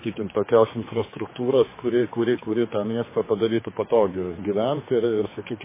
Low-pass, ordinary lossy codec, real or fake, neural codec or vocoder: 3.6 kHz; MP3, 16 kbps; fake; codec, 16 kHz, 4 kbps, X-Codec, HuBERT features, trained on general audio